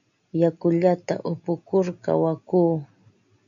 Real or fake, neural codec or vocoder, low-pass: real; none; 7.2 kHz